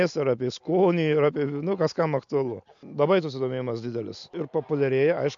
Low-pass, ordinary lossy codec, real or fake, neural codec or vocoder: 7.2 kHz; MP3, 64 kbps; real; none